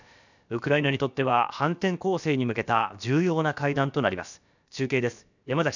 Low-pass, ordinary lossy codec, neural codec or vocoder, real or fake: 7.2 kHz; none; codec, 16 kHz, about 1 kbps, DyCAST, with the encoder's durations; fake